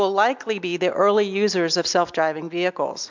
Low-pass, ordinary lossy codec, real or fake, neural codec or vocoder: 7.2 kHz; MP3, 64 kbps; real; none